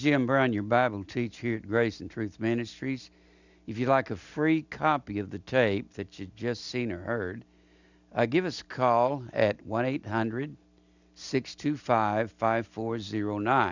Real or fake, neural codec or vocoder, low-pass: real; none; 7.2 kHz